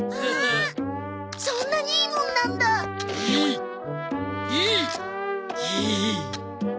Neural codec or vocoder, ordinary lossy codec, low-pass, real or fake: none; none; none; real